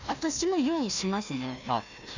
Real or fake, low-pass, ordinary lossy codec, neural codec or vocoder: fake; 7.2 kHz; none; codec, 16 kHz, 1 kbps, FunCodec, trained on Chinese and English, 50 frames a second